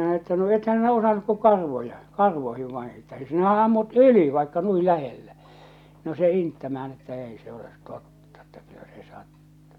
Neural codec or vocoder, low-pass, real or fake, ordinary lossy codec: none; 19.8 kHz; real; none